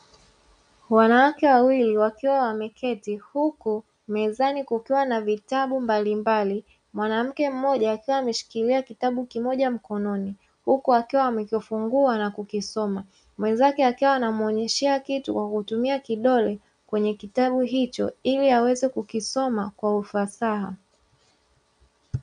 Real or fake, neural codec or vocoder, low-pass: real; none; 9.9 kHz